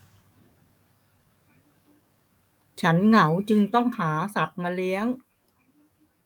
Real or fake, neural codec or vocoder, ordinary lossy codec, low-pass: fake; codec, 44.1 kHz, 7.8 kbps, DAC; none; 19.8 kHz